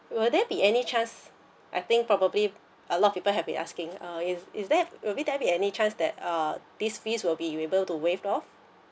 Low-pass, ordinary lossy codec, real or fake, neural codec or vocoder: none; none; real; none